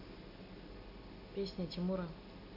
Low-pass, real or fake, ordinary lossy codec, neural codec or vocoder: 5.4 kHz; real; AAC, 32 kbps; none